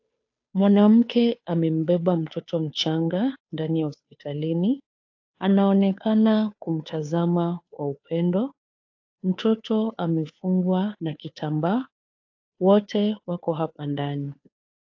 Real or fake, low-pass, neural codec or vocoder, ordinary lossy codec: fake; 7.2 kHz; codec, 16 kHz, 2 kbps, FunCodec, trained on Chinese and English, 25 frames a second; AAC, 48 kbps